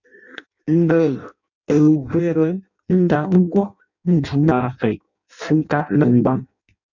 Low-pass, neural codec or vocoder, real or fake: 7.2 kHz; codec, 16 kHz in and 24 kHz out, 0.6 kbps, FireRedTTS-2 codec; fake